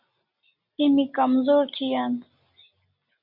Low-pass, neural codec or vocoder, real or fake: 5.4 kHz; none; real